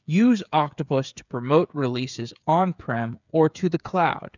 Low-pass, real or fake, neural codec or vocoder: 7.2 kHz; fake; codec, 16 kHz, 8 kbps, FreqCodec, smaller model